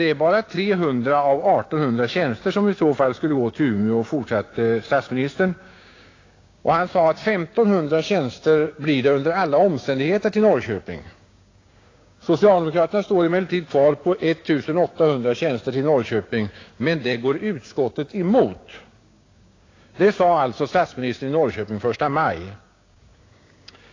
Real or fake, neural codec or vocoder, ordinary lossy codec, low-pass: fake; codec, 16 kHz, 6 kbps, DAC; AAC, 32 kbps; 7.2 kHz